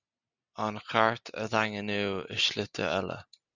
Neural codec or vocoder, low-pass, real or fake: none; 7.2 kHz; real